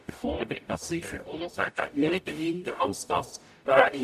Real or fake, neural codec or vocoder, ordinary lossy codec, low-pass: fake; codec, 44.1 kHz, 0.9 kbps, DAC; none; 14.4 kHz